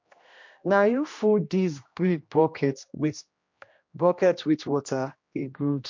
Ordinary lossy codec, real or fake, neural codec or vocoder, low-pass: MP3, 48 kbps; fake; codec, 16 kHz, 1 kbps, X-Codec, HuBERT features, trained on general audio; 7.2 kHz